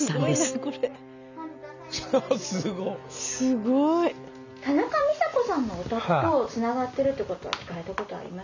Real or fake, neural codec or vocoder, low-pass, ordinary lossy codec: real; none; 7.2 kHz; none